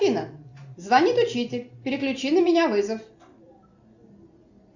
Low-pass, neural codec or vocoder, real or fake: 7.2 kHz; none; real